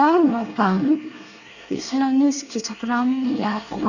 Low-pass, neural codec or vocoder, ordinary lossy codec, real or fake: 7.2 kHz; codec, 24 kHz, 1 kbps, SNAC; none; fake